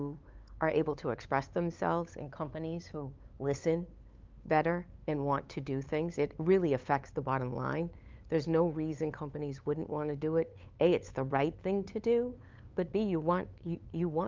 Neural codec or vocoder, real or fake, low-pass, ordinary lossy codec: codec, 16 kHz, 8 kbps, FunCodec, trained on LibriTTS, 25 frames a second; fake; 7.2 kHz; Opus, 24 kbps